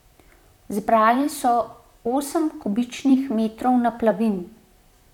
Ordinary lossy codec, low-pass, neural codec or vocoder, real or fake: none; 19.8 kHz; vocoder, 44.1 kHz, 128 mel bands, Pupu-Vocoder; fake